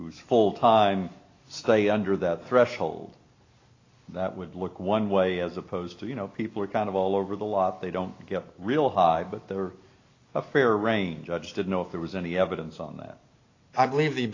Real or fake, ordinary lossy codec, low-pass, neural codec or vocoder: real; AAC, 32 kbps; 7.2 kHz; none